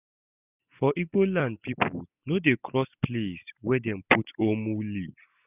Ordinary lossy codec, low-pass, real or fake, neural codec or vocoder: none; 3.6 kHz; real; none